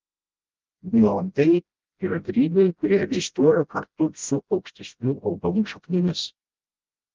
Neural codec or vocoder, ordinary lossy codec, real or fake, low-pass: codec, 16 kHz, 0.5 kbps, FreqCodec, smaller model; Opus, 32 kbps; fake; 7.2 kHz